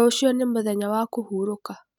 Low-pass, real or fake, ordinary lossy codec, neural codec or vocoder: 19.8 kHz; real; none; none